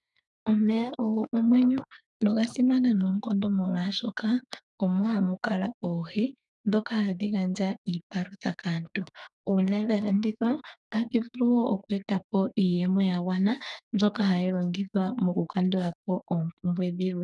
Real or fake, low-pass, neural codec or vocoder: fake; 10.8 kHz; codec, 44.1 kHz, 2.6 kbps, SNAC